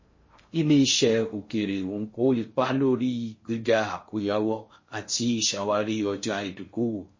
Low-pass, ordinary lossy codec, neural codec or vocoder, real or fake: 7.2 kHz; MP3, 32 kbps; codec, 16 kHz in and 24 kHz out, 0.6 kbps, FocalCodec, streaming, 4096 codes; fake